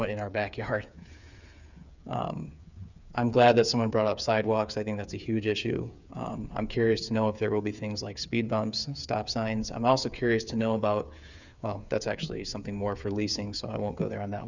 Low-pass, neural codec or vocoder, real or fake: 7.2 kHz; codec, 16 kHz, 8 kbps, FreqCodec, smaller model; fake